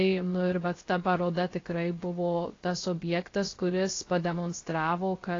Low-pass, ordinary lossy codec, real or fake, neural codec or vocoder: 7.2 kHz; AAC, 32 kbps; fake; codec, 16 kHz, 0.3 kbps, FocalCodec